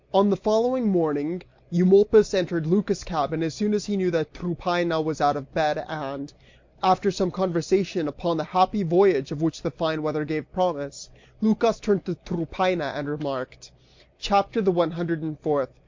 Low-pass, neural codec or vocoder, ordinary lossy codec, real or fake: 7.2 kHz; none; MP3, 48 kbps; real